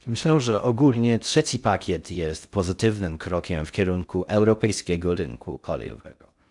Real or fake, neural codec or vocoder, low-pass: fake; codec, 16 kHz in and 24 kHz out, 0.6 kbps, FocalCodec, streaming, 2048 codes; 10.8 kHz